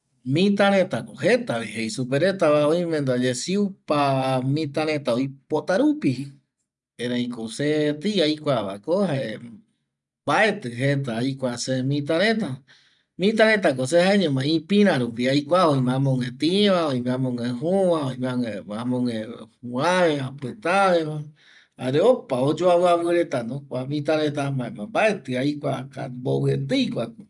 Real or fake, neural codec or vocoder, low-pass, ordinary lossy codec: real; none; 10.8 kHz; none